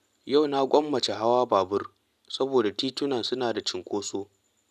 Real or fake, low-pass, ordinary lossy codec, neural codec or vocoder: real; 14.4 kHz; none; none